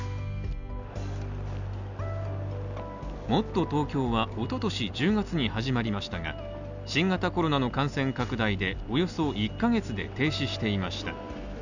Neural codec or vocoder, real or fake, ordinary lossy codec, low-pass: none; real; none; 7.2 kHz